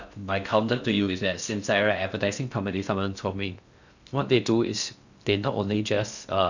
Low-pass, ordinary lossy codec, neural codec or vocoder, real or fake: 7.2 kHz; none; codec, 16 kHz in and 24 kHz out, 0.6 kbps, FocalCodec, streaming, 4096 codes; fake